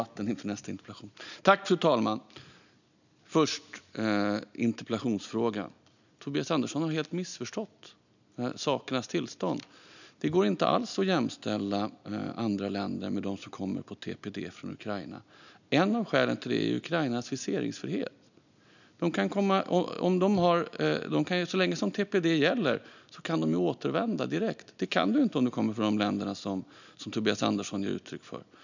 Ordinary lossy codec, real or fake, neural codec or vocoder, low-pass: none; real; none; 7.2 kHz